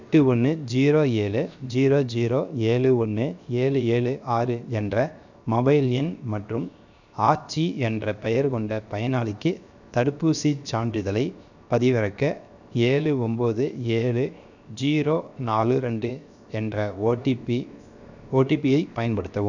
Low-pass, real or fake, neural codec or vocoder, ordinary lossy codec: 7.2 kHz; fake; codec, 16 kHz, 0.7 kbps, FocalCodec; none